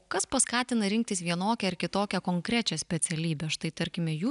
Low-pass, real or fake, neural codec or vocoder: 10.8 kHz; real; none